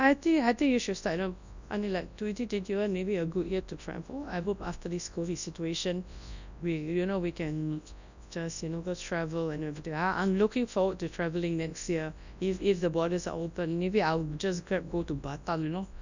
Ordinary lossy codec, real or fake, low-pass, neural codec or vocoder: none; fake; 7.2 kHz; codec, 24 kHz, 0.9 kbps, WavTokenizer, large speech release